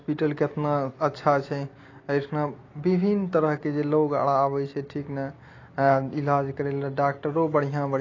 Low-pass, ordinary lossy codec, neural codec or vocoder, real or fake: 7.2 kHz; AAC, 32 kbps; none; real